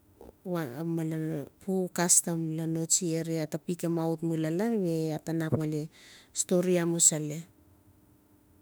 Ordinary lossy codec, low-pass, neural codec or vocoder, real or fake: none; none; autoencoder, 48 kHz, 32 numbers a frame, DAC-VAE, trained on Japanese speech; fake